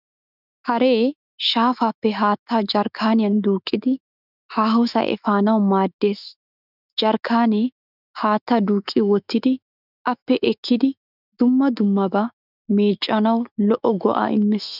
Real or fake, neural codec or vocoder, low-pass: fake; autoencoder, 48 kHz, 128 numbers a frame, DAC-VAE, trained on Japanese speech; 5.4 kHz